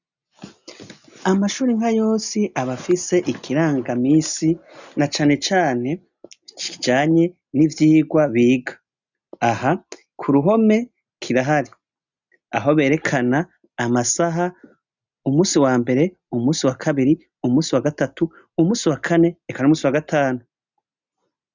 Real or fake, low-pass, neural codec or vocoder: real; 7.2 kHz; none